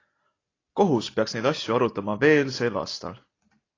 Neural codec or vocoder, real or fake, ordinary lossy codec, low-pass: none; real; AAC, 32 kbps; 7.2 kHz